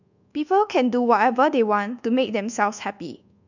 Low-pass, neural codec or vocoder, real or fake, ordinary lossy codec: 7.2 kHz; codec, 24 kHz, 1.2 kbps, DualCodec; fake; none